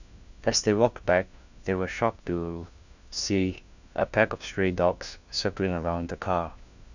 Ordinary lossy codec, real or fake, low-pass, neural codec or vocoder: none; fake; 7.2 kHz; codec, 16 kHz, 1 kbps, FunCodec, trained on LibriTTS, 50 frames a second